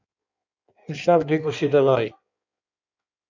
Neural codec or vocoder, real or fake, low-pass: codec, 16 kHz in and 24 kHz out, 1.1 kbps, FireRedTTS-2 codec; fake; 7.2 kHz